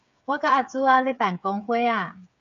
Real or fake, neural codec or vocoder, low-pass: fake; codec, 16 kHz, 8 kbps, FreqCodec, smaller model; 7.2 kHz